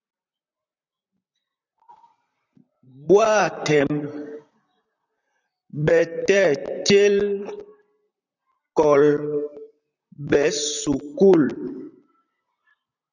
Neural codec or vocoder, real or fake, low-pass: vocoder, 44.1 kHz, 128 mel bands, Pupu-Vocoder; fake; 7.2 kHz